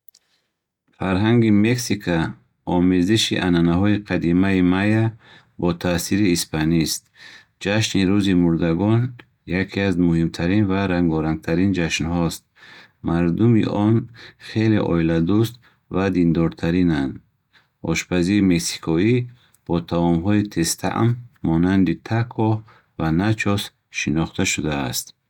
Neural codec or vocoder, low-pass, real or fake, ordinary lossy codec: none; 19.8 kHz; real; none